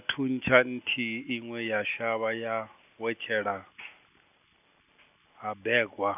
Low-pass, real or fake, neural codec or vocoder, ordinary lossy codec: 3.6 kHz; real; none; none